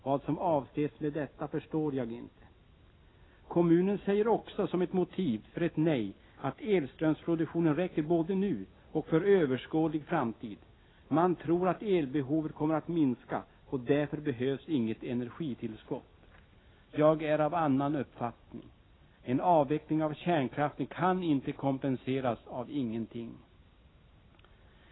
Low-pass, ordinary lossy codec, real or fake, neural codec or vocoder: 7.2 kHz; AAC, 16 kbps; real; none